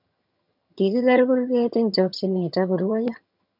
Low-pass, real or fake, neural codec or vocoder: 5.4 kHz; fake; vocoder, 22.05 kHz, 80 mel bands, HiFi-GAN